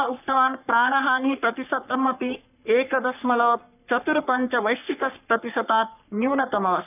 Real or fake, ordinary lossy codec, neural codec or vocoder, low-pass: fake; none; codec, 44.1 kHz, 3.4 kbps, Pupu-Codec; 3.6 kHz